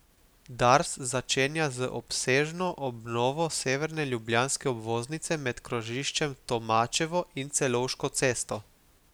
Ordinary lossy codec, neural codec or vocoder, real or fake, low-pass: none; none; real; none